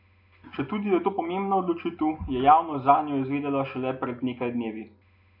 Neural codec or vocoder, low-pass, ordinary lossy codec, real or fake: none; 5.4 kHz; MP3, 48 kbps; real